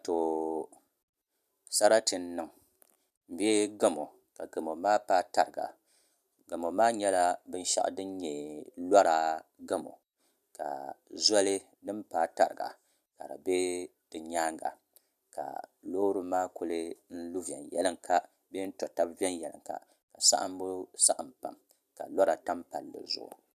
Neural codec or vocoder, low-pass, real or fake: none; 14.4 kHz; real